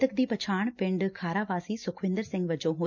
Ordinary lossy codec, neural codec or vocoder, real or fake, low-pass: none; none; real; 7.2 kHz